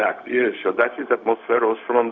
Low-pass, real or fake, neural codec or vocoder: 7.2 kHz; fake; codec, 16 kHz, 0.4 kbps, LongCat-Audio-Codec